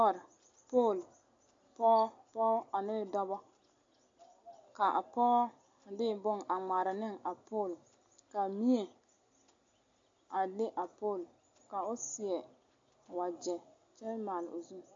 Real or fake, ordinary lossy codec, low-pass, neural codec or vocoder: real; AAC, 48 kbps; 7.2 kHz; none